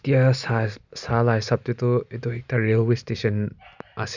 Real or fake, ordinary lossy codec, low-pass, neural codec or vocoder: real; Opus, 64 kbps; 7.2 kHz; none